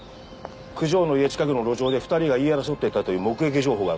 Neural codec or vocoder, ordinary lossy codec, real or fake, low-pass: none; none; real; none